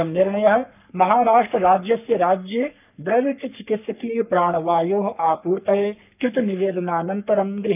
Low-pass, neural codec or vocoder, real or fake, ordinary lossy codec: 3.6 kHz; codec, 44.1 kHz, 3.4 kbps, Pupu-Codec; fake; none